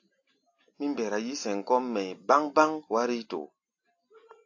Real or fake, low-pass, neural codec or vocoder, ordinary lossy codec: real; 7.2 kHz; none; AAC, 48 kbps